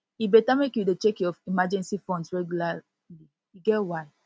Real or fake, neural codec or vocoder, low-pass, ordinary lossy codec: real; none; none; none